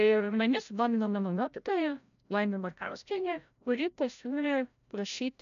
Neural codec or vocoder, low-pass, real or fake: codec, 16 kHz, 0.5 kbps, FreqCodec, larger model; 7.2 kHz; fake